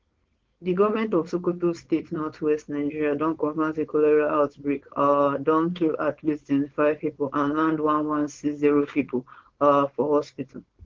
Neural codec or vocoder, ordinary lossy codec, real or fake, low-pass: codec, 16 kHz, 4.8 kbps, FACodec; Opus, 16 kbps; fake; 7.2 kHz